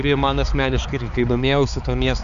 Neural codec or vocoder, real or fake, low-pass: codec, 16 kHz, 4 kbps, X-Codec, HuBERT features, trained on balanced general audio; fake; 7.2 kHz